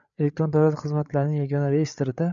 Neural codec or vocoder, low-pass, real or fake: codec, 16 kHz, 8 kbps, FreqCodec, larger model; 7.2 kHz; fake